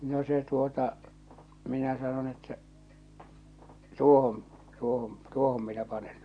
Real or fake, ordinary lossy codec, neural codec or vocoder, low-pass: real; none; none; 9.9 kHz